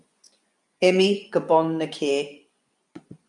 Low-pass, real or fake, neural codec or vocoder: 10.8 kHz; fake; vocoder, 24 kHz, 100 mel bands, Vocos